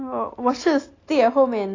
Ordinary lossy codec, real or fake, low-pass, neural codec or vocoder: AAC, 32 kbps; real; 7.2 kHz; none